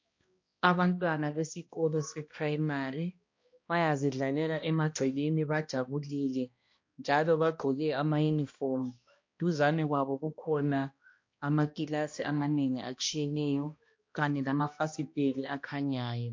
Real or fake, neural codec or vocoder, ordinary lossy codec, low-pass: fake; codec, 16 kHz, 1 kbps, X-Codec, HuBERT features, trained on balanced general audio; MP3, 48 kbps; 7.2 kHz